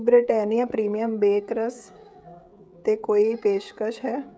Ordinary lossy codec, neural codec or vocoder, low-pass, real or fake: none; codec, 16 kHz, 16 kbps, FreqCodec, smaller model; none; fake